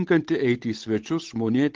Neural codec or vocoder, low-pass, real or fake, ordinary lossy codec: codec, 16 kHz, 8 kbps, FunCodec, trained on Chinese and English, 25 frames a second; 7.2 kHz; fake; Opus, 16 kbps